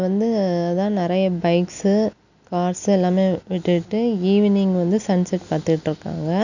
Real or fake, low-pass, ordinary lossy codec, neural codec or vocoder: real; 7.2 kHz; none; none